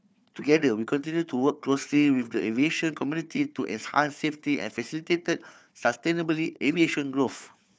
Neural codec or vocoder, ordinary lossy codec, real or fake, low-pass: codec, 16 kHz, 4 kbps, FunCodec, trained on Chinese and English, 50 frames a second; none; fake; none